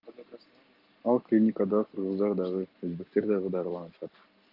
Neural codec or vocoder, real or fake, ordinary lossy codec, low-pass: none; real; Opus, 32 kbps; 5.4 kHz